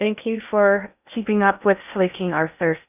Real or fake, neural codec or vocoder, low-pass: fake; codec, 16 kHz in and 24 kHz out, 0.6 kbps, FocalCodec, streaming, 2048 codes; 3.6 kHz